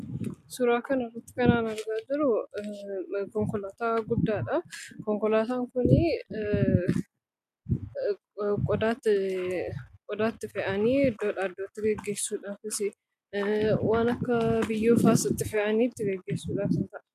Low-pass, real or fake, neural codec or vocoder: 14.4 kHz; real; none